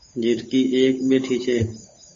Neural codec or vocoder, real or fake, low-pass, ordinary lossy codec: codec, 16 kHz, 8 kbps, FunCodec, trained on Chinese and English, 25 frames a second; fake; 7.2 kHz; MP3, 32 kbps